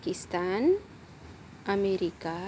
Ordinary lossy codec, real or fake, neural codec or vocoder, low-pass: none; real; none; none